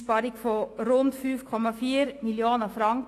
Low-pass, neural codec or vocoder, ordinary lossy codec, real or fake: 14.4 kHz; autoencoder, 48 kHz, 128 numbers a frame, DAC-VAE, trained on Japanese speech; AAC, 48 kbps; fake